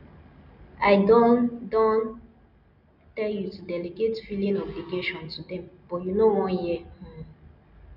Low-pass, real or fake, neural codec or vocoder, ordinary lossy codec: 5.4 kHz; real; none; none